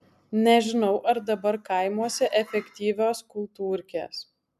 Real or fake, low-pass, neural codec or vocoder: real; 14.4 kHz; none